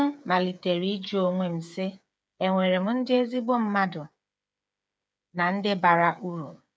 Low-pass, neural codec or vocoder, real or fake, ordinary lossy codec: none; codec, 16 kHz, 8 kbps, FreqCodec, smaller model; fake; none